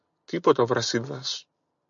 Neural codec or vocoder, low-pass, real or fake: none; 7.2 kHz; real